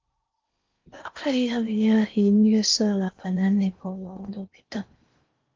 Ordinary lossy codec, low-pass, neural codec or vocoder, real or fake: Opus, 24 kbps; 7.2 kHz; codec, 16 kHz in and 24 kHz out, 0.6 kbps, FocalCodec, streaming, 4096 codes; fake